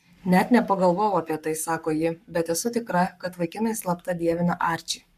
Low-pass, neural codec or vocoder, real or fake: 14.4 kHz; codec, 44.1 kHz, 7.8 kbps, Pupu-Codec; fake